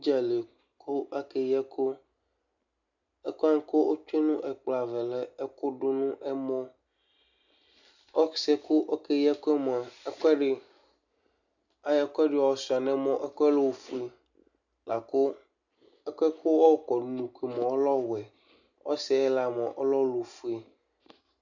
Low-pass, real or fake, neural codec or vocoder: 7.2 kHz; real; none